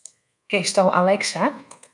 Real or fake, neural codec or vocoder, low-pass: fake; codec, 24 kHz, 1.2 kbps, DualCodec; 10.8 kHz